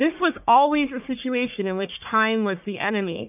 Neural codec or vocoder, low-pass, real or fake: codec, 44.1 kHz, 1.7 kbps, Pupu-Codec; 3.6 kHz; fake